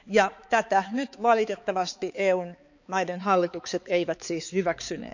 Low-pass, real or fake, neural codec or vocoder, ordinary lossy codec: 7.2 kHz; fake; codec, 16 kHz, 4 kbps, X-Codec, HuBERT features, trained on balanced general audio; none